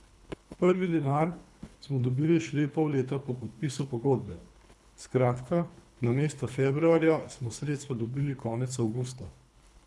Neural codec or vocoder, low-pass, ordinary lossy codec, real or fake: codec, 24 kHz, 3 kbps, HILCodec; none; none; fake